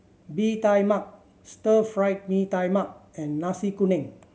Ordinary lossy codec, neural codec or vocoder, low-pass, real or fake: none; none; none; real